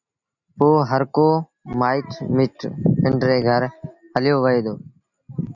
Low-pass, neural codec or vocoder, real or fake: 7.2 kHz; none; real